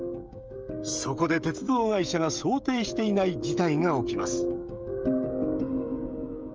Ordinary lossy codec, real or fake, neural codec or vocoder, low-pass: Opus, 24 kbps; fake; codec, 16 kHz, 16 kbps, FreqCodec, smaller model; 7.2 kHz